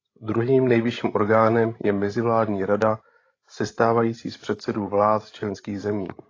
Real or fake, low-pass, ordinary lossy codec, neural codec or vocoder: fake; 7.2 kHz; AAC, 32 kbps; codec, 16 kHz, 16 kbps, FreqCodec, larger model